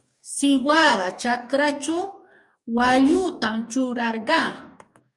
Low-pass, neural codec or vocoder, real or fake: 10.8 kHz; codec, 44.1 kHz, 2.6 kbps, DAC; fake